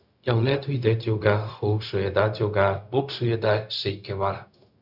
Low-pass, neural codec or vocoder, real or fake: 5.4 kHz; codec, 16 kHz, 0.4 kbps, LongCat-Audio-Codec; fake